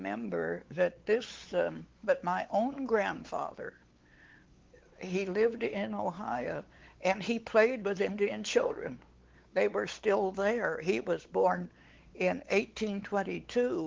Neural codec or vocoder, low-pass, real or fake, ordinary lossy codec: codec, 16 kHz, 4 kbps, X-Codec, WavLM features, trained on Multilingual LibriSpeech; 7.2 kHz; fake; Opus, 16 kbps